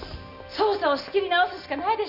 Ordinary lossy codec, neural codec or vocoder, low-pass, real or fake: none; none; 5.4 kHz; real